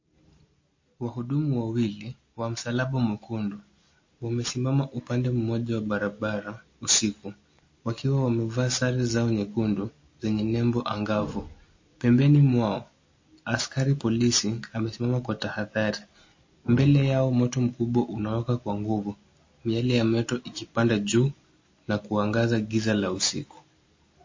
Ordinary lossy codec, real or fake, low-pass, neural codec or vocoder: MP3, 32 kbps; real; 7.2 kHz; none